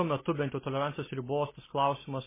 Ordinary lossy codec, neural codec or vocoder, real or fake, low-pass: MP3, 16 kbps; none; real; 3.6 kHz